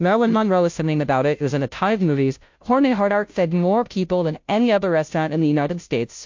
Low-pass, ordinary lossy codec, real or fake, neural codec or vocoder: 7.2 kHz; MP3, 48 kbps; fake; codec, 16 kHz, 0.5 kbps, FunCodec, trained on Chinese and English, 25 frames a second